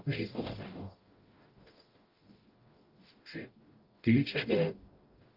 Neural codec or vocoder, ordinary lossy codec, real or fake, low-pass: codec, 44.1 kHz, 0.9 kbps, DAC; Opus, 32 kbps; fake; 5.4 kHz